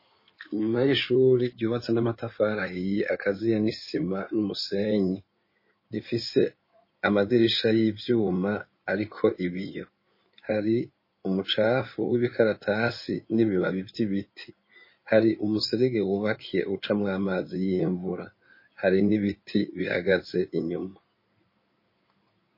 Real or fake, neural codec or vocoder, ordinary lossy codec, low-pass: fake; codec, 16 kHz in and 24 kHz out, 2.2 kbps, FireRedTTS-2 codec; MP3, 24 kbps; 5.4 kHz